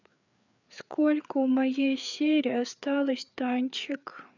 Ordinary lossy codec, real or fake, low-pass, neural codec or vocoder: none; fake; 7.2 kHz; codec, 16 kHz, 4 kbps, FreqCodec, larger model